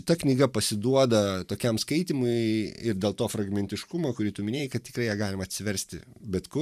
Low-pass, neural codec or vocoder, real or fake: 14.4 kHz; vocoder, 44.1 kHz, 128 mel bands every 512 samples, BigVGAN v2; fake